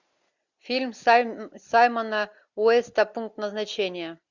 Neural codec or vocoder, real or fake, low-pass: none; real; 7.2 kHz